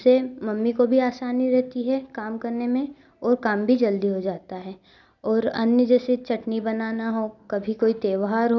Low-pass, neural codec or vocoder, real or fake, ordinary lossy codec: 7.2 kHz; none; real; none